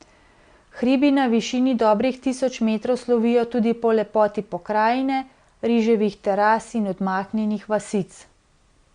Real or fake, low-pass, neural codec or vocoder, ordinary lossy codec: real; 9.9 kHz; none; Opus, 64 kbps